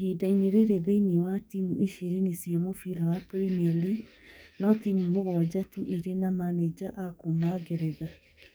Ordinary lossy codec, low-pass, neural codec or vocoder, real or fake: none; none; codec, 44.1 kHz, 2.6 kbps, SNAC; fake